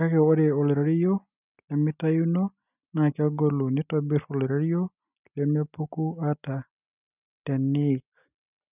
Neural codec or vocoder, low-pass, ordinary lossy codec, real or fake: none; 3.6 kHz; none; real